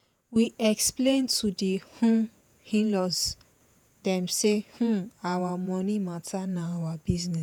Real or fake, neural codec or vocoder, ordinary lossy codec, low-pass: fake; vocoder, 48 kHz, 128 mel bands, Vocos; none; none